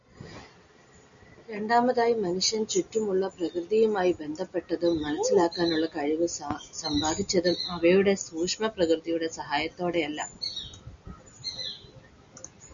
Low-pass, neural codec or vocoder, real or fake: 7.2 kHz; none; real